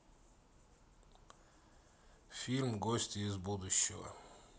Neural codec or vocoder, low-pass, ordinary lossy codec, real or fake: none; none; none; real